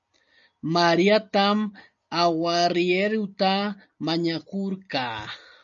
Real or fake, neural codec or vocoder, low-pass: real; none; 7.2 kHz